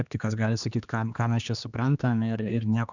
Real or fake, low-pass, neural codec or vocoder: fake; 7.2 kHz; codec, 16 kHz, 2 kbps, X-Codec, HuBERT features, trained on general audio